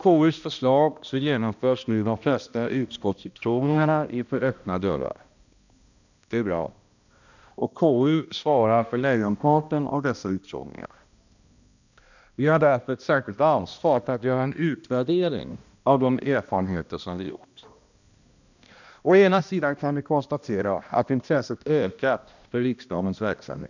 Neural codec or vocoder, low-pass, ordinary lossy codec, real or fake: codec, 16 kHz, 1 kbps, X-Codec, HuBERT features, trained on balanced general audio; 7.2 kHz; none; fake